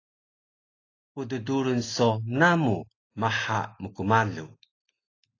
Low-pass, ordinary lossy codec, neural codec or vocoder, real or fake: 7.2 kHz; AAC, 32 kbps; none; real